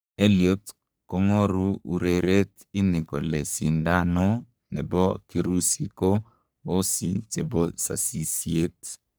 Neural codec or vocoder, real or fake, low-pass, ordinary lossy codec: codec, 44.1 kHz, 3.4 kbps, Pupu-Codec; fake; none; none